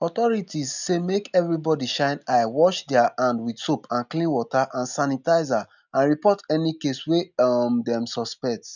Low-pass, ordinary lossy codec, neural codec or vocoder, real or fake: 7.2 kHz; none; none; real